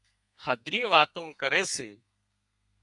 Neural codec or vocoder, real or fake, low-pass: codec, 32 kHz, 1.9 kbps, SNAC; fake; 10.8 kHz